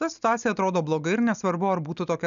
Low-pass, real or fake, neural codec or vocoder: 7.2 kHz; real; none